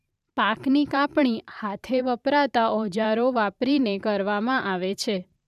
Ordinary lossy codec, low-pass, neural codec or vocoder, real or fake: none; 14.4 kHz; vocoder, 44.1 kHz, 128 mel bands every 512 samples, BigVGAN v2; fake